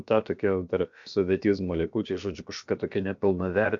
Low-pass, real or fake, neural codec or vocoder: 7.2 kHz; fake; codec, 16 kHz, about 1 kbps, DyCAST, with the encoder's durations